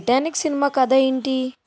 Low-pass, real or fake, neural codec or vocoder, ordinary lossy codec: none; real; none; none